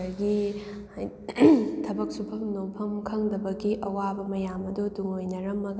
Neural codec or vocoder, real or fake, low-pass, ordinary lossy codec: none; real; none; none